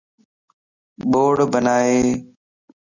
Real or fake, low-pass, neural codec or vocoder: real; 7.2 kHz; none